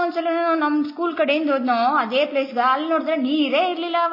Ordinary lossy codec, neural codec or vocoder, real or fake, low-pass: MP3, 24 kbps; none; real; 5.4 kHz